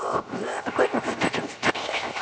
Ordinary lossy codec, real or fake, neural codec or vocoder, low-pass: none; fake; codec, 16 kHz, 0.7 kbps, FocalCodec; none